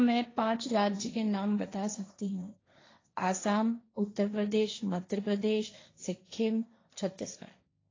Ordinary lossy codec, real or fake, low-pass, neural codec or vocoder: AAC, 32 kbps; fake; 7.2 kHz; codec, 16 kHz, 1.1 kbps, Voila-Tokenizer